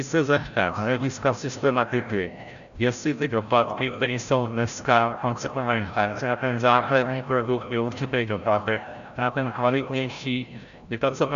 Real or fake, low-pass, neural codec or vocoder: fake; 7.2 kHz; codec, 16 kHz, 0.5 kbps, FreqCodec, larger model